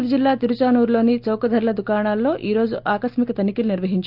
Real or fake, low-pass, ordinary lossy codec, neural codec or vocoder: real; 5.4 kHz; Opus, 24 kbps; none